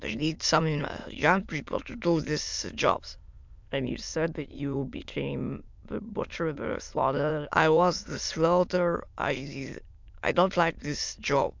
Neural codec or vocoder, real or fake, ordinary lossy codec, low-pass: autoencoder, 22.05 kHz, a latent of 192 numbers a frame, VITS, trained on many speakers; fake; MP3, 64 kbps; 7.2 kHz